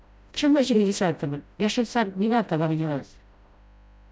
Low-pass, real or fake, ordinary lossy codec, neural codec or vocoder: none; fake; none; codec, 16 kHz, 0.5 kbps, FreqCodec, smaller model